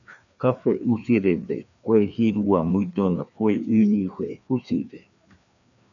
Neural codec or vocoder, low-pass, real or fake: codec, 16 kHz, 2 kbps, FreqCodec, larger model; 7.2 kHz; fake